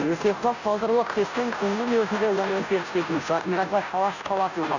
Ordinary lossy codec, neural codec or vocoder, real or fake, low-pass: none; codec, 16 kHz, 0.5 kbps, FunCodec, trained on Chinese and English, 25 frames a second; fake; 7.2 kHz